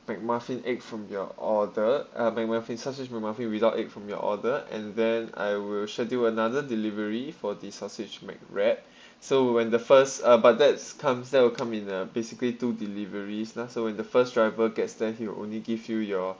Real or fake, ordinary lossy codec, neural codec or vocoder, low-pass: real; none; none; none